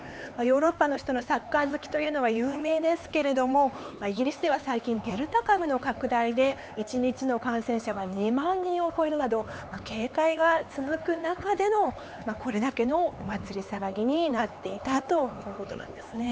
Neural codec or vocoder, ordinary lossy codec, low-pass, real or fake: codec, 16 kHz, 4 kbps, X-Codec, HuBERT features, trained on LibriSpeech; none; none; fake